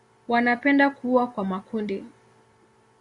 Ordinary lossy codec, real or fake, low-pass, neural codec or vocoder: Opus, 64 kbps; real; 10.8 kHz; none